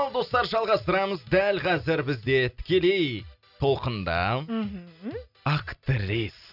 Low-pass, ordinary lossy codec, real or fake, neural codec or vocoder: 5.4 kHz; none; real; none